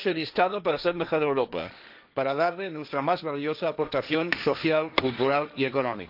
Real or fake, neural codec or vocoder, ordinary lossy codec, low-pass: fake; codec, 16 kHz, 1.1 kbps, Voila-Tokenizer; none; 5.4 kHz